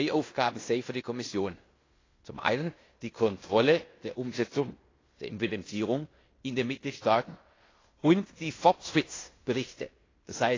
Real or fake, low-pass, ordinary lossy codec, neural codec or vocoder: fake; 7.2 kHz; AAC, 32 kbps; codec, 16 kHz in and 24 kHz out, 0.9 kbps, LongCat-Audio-Codec, fine tuned four codebook decoder